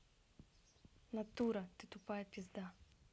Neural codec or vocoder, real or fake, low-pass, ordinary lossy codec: none; real; none; none